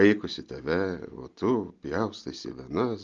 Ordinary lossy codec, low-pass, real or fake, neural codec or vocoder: Opus, 32 kbps; 7.2 kHz; real; none